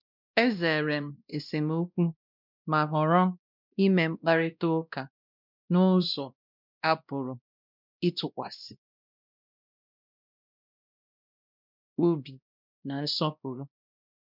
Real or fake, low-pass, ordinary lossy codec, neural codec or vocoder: fake; 5.4 kHz; none; codec, 16 kHz, 1 kbps, X-Codec, WavLM features, trained on Multilingual LibriSpeech